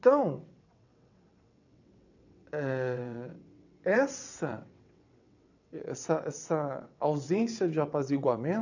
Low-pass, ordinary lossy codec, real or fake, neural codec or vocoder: 7.2 kHz; none; fake; vocoder, 22.05 kHz, 80 mel bands, WaveNeXt